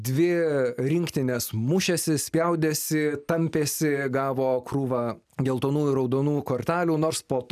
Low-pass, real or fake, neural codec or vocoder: 14.4 kHz; real; none